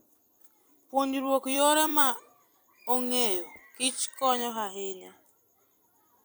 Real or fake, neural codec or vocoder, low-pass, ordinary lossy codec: fake; vocoder, 44.1 kHz, 128 mel bands every 256 samples, BigVGAN v2; none; none